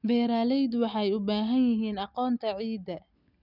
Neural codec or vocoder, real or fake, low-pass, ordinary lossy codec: none; real; 5.4 kHz; none